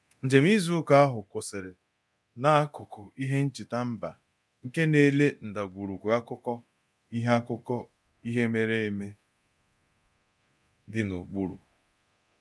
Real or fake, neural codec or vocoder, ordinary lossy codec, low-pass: fake; codec, 24 kHz, 0.9 kbps, DualCodec; none; none